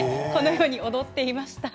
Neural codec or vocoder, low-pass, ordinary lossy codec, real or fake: none; none; none; real